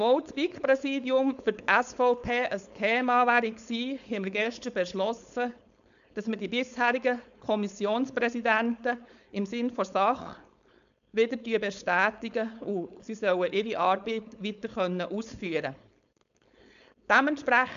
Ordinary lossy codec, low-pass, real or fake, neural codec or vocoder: none; 7.2 kHz; fake; codec, 16 kHz, 4.8 kbps, FACodec